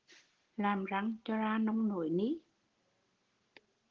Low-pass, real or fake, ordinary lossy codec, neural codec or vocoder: 7.2 kHz; real; Opus, 16 kbps; none